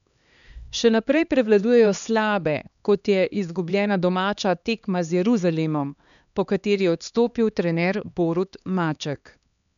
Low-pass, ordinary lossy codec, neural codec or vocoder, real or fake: 7.2 kHz; none; codec, 16 kHz, 2 kbps, X-Codec, HuBERT features, trained on LibriSpeech; fake